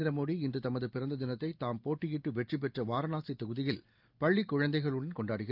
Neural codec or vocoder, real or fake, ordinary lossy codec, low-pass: none; real; Opus, 24 kbps; 5.4 kHz